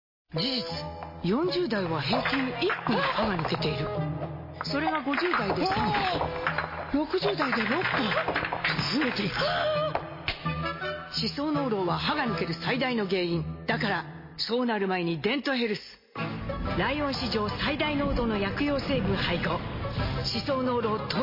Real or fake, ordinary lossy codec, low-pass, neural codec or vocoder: real; MP3, 24 kbps; 5.4 kHz; none